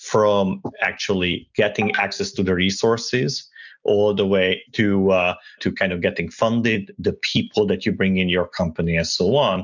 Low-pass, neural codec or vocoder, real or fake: 7.2 kHz; none; real